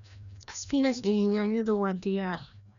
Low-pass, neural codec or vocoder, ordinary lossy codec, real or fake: 7.2 kHz; codec, 16 kHz, 1 kbps, FreqCodec, larger model; none; fake